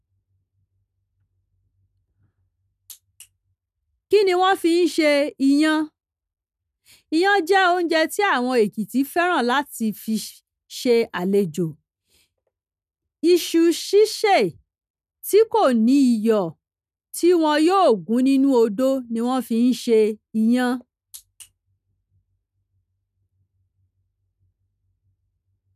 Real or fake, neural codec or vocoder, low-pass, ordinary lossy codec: real; none; 14.4 kHz; none